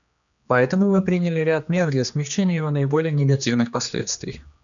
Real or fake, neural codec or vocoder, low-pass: fake; codec, 16 kHz, 2 kbps, X-Codec, HuBERT features, trained on general audio; 7.2 kHz